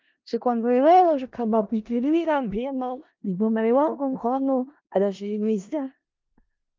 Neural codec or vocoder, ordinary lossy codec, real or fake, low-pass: codec, 16 kHz in and 24 kHz out, 0.4 kbps, LongCat-Audio-Codec, four codebook decoder; Opus, 32 kbps; fake; 7.2 kHz